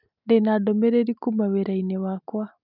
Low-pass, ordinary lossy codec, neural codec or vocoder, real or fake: 5.4 kHz; none; none; real